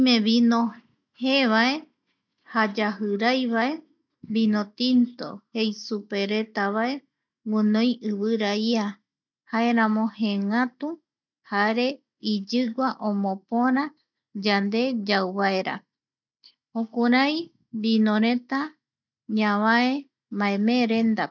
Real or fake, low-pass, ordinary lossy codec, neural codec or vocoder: real; 7.2 kHz; none; none